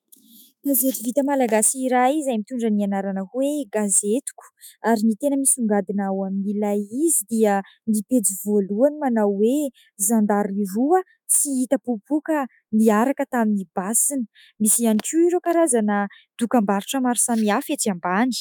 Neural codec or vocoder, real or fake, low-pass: autoencoder, 48 kHz, 128 numbers a frame, DAC-VAE, trained on Japanese speech; fake; 19.8 kHz